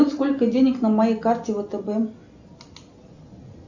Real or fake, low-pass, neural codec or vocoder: real; 7.2 kHz; none